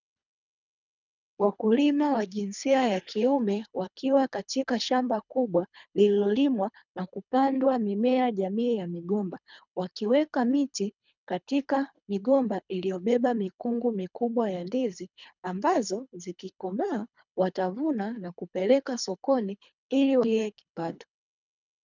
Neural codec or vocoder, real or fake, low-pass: codec, 24 kHz, 3 kbps, HILCodec; fake; 7.2 kHz